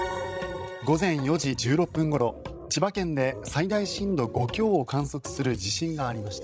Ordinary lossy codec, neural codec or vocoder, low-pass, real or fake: none; codec, 16 kHz, 16 kbps, FreqCodec, larger model; none; fake